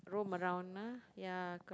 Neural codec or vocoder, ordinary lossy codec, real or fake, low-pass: none; none; real; none